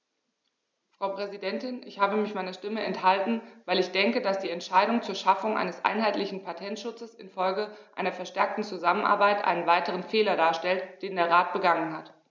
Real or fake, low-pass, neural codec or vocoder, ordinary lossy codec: real; none; none; none